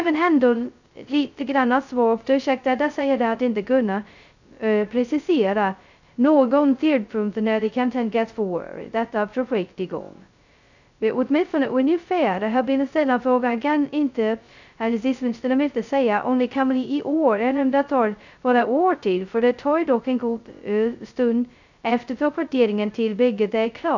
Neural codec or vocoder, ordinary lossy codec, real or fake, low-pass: codec, 16 kHz, 0.2 kbps, FocalCodec; none; fake; 7.2 kHz